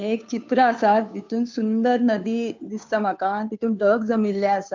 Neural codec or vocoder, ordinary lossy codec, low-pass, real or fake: codec, 16 kHz, 2 kbps, FunCodec, trained on Chinese and English, 25 frames a second; MP3, 64 kbps; 7.2 kHz; fake